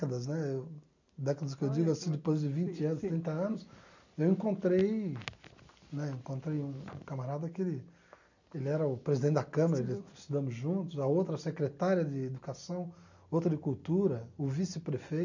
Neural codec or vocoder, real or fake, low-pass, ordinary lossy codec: none; real; 7.2 kHz; none